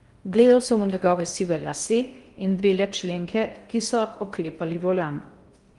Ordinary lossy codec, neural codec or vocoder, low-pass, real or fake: Opus, 32 kbps; codec, 16 kHz in and 24 kHz out, 0.6 kbps, FocalCodec, streaming, 4096 codes; 10.8 kHz; fake